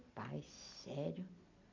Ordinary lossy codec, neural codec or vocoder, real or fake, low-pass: none; none; real; 7.2 kHz